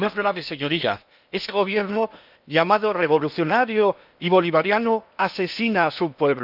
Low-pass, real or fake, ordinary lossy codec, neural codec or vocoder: 5.4 kHz; fake; none; codec, 16 kHz in and 24 kHz out, 0.8 kbps, FocalCodec, streaming, 65536 codes